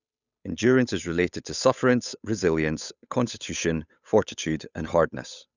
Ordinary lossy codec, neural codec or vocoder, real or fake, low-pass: none; codec, 16 kHz, 8 kbps, FunCodec, trained on Chinese and English, 25 frames a second; fake; 7.2 kHz